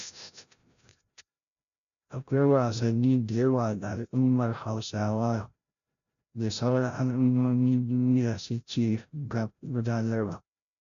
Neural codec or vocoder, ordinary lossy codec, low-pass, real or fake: codec, 16 kHz, 0.5 kbps, FreqCodec, larger model; none; 7.2 kHz; fake